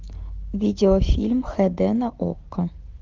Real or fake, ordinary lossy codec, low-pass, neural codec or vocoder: real; Opus, 16 kbps; 7.2 kHz; none